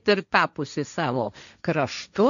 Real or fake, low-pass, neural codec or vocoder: fake; 7.2 kHz; codec, 16 kHz, 1.1 kbps, Voila-Tokenizer